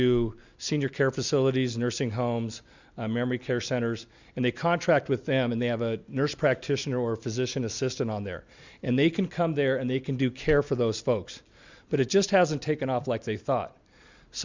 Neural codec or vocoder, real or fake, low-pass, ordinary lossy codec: none; real; 7.2 kHz; Opus, 64 kbps